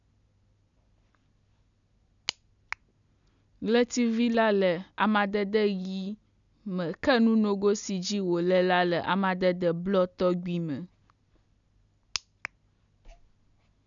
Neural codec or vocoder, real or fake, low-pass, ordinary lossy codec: none; real; 7.2 kHz; none